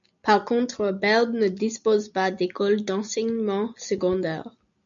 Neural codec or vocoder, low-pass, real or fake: none; 7.2 kHz; real